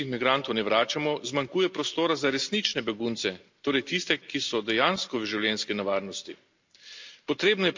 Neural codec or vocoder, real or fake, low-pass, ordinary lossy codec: none; real; 7.2 kHz; MP3, 64 kbps